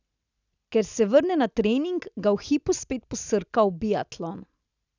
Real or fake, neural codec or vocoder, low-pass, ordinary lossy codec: real; none; 7.2 kHz; none